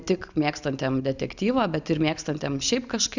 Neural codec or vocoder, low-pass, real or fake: none; 7.2 kHz; real